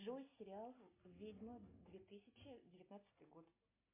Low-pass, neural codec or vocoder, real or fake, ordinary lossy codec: 3.6 kHz; none; real; MP3, 32 kbps